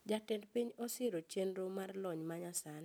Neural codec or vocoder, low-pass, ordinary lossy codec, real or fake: none; none; none; real